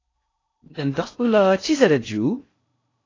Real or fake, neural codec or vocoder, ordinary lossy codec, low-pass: fake; codec, 16 kHz in and 24 kHz out, 0.6 kbps, FocalCodec, streaming, 4096 codes; AAC, 32 kbps; 7.2 kHz